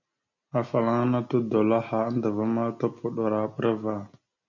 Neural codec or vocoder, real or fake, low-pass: none; real; 7.2 kHz